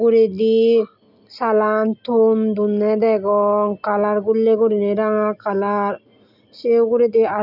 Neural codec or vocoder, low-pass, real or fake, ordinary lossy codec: none; 5.4 kHz; real; none